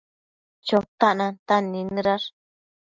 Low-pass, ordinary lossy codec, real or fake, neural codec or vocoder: 7.2 kHz; MP3, 64 kbps; real; none